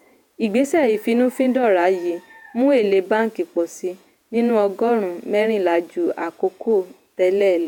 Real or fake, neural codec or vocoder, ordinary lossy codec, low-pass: fake; vocoder, 48 kHz, 128 mel bands, Vocos; none; 19.8 kHz